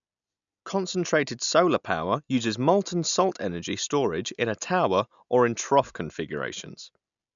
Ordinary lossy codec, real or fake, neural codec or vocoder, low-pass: none; real; none; 7.2 kHz